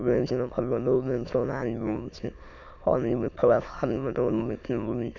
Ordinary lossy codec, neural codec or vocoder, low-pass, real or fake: none; autoencoder, 22.05 kHz, a latent of 192 numbers a frame, VITS, trained on many speakers; 7.2 kHz; fake